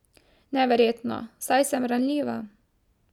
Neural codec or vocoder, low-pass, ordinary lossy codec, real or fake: none; 19.8 kHz; none; real